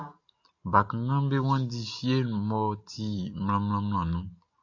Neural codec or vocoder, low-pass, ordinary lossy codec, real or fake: none; 7.2 kHz; AAC, 48 kbps; real